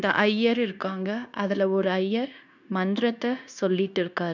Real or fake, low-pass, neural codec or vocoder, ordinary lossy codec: fake; 7.2 kHz; codec, 16 kHz, 0.9 kbps, LongCat-Audio-Codec; none